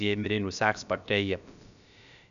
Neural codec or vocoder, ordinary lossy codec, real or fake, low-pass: codec, 16 kHz, about 1 kbps, DyCAST, with the encoder's durations; none; fake; 7.2 kHz